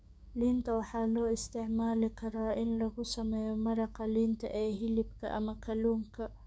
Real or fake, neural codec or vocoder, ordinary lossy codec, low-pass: fake; codec, 16 kHz, 6 kbps, DAC; none; none